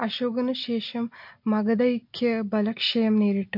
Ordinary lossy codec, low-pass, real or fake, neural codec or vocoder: MP3, 32 kbps; 5.4 kHz; real; none